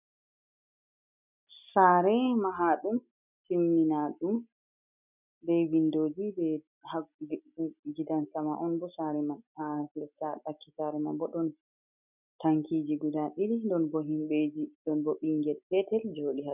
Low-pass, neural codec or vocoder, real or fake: 3.6 kHz; none; real